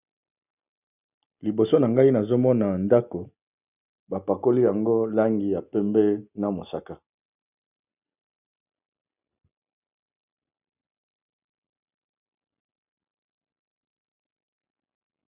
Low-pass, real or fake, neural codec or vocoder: 3.6 kHz; real; none